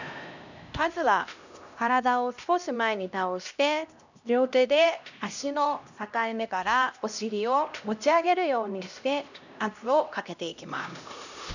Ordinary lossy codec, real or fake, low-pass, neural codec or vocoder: none; fake; 7.2 kHz; codec, 16 kHz, 1 kbps, X-Codec, HuBERT features, trained on LibriSpeech